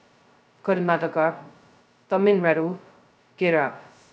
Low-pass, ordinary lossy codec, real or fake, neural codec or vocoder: none; none; fake; codec, 16 kHz, 0.2 kbps, FocalCodec